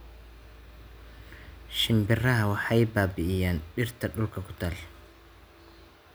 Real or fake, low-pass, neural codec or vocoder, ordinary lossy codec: real; none; none; none